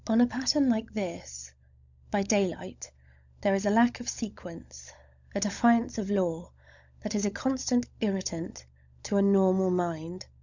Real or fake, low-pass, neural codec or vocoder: fake; 7.2 kHz; codec, 16 kHz, 16 kbps, FunCodec, trained on LibriTTS, 50 frames a second